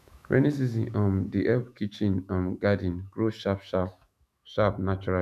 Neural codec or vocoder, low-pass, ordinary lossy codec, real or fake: autoencoder, 48 kHz, 128 numbers a frame, DAC-VAE, trained on Japanese speech; 14.4 kHz; none; fake